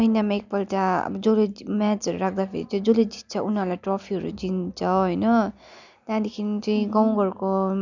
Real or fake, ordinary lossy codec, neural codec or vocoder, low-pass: real; none; none; 7.2 kHz